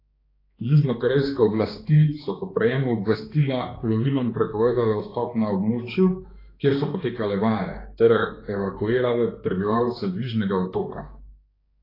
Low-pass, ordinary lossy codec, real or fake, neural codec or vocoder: 5.4 kHz; AAC, 24 kbps; fake; codec, 16 kHz, 2 kbps, X-Codec, HuBERT features, trained on balanced general audio